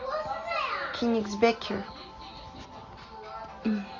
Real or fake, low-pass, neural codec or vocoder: real; 7.2 kHz; none